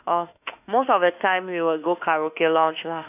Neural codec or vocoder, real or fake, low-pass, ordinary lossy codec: autoencoder, 48 kHz, 32 numbers a frame, DAC-VAE, trained on Japanese speech; fake; 3.6 kHz; none